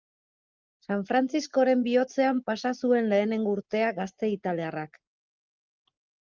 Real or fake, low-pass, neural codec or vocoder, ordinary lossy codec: real; 7.2 kHz; none; Opus, 24 kbps